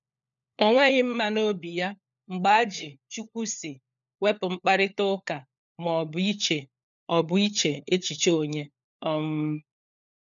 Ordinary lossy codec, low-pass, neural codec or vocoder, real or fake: none; 7.2 kHz; codec, 16 kHz, 4 kbps, FunCodec, trained on LibriTTS, 50 frames a second; fake